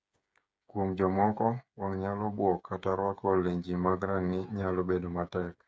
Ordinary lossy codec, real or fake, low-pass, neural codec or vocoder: none; fake; none; codec, 16 kHz, 8 kbps, FreqCodec, smaller model